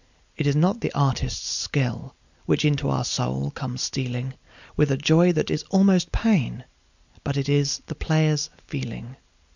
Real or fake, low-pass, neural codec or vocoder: real; 7.2 kHz; none